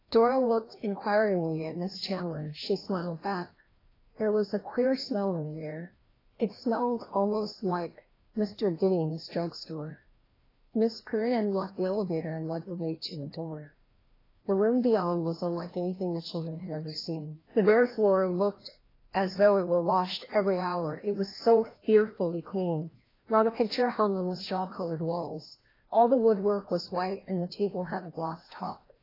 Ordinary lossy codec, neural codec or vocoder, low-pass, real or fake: AAC, 24 kbps; codec, 16 kHz, 1 kbps, FreqCodec, larger model; 5.4 kHz; fake